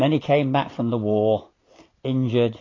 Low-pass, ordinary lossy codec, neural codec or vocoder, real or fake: 7.2 kHz; AAC, 32 kbps; none; real